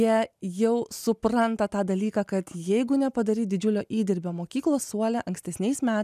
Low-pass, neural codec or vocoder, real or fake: 14.4 kHz; none; real